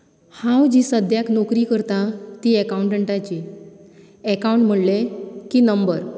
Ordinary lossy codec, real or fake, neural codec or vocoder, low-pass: none; real; none; none